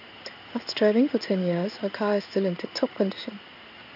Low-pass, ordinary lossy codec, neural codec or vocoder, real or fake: 5.4 kHz; none; codec, 16 kHz in and 24 kHz out, 1 kbps, XY-Tokenizer; fake